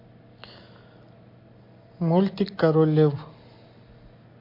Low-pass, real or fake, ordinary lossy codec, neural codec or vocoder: 5.4 kHz; real; MP3, 32 kbps; none